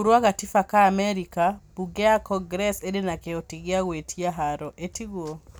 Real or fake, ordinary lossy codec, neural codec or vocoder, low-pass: real; none; none; none